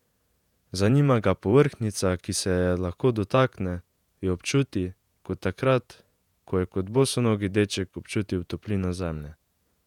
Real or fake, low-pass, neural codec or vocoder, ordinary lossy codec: fake; 19.8 kHz; vocoder, 48 kHz, 128 mel bands, Vocos; none